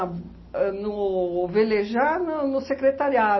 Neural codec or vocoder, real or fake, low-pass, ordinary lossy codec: none; real; 7.2 kHz; MP3, 24 kbps